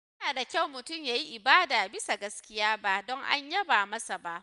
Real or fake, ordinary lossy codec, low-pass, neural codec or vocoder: real; none; 10.8 kHz; none